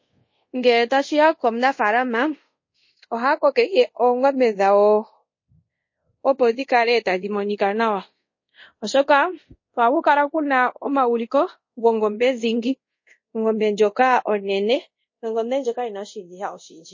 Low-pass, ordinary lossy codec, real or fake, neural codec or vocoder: 7.2 kHz; MP3, 32 kbps; fake; codec, 24 kHz, 0.5 kbps, DualCodec